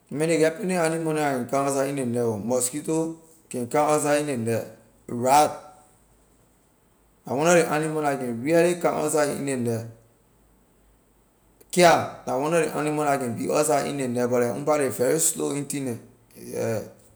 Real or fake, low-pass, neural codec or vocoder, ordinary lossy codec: real; none; none; none